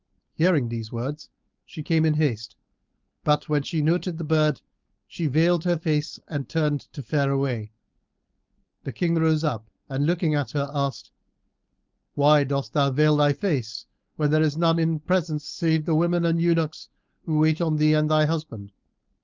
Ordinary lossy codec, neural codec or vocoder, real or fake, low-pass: Opus, 24 kbps; codec, 16 kHz, 4.8 kbps, FACodec; fake; 7.2 kHz